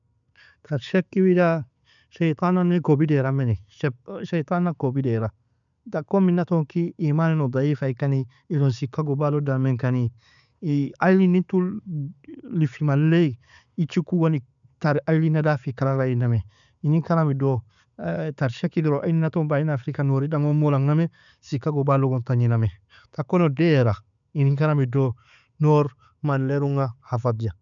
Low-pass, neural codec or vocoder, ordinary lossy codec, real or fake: 7.2 kHz; none; none; real